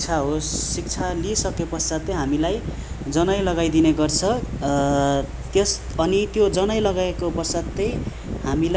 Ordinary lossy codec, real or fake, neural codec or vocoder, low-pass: none; real; none; none